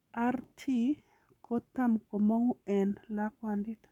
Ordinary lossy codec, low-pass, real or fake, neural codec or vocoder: none; 19.8 kHz; fake; codec, 44.1 kHz, 7.8 kbps, Pupu-Codec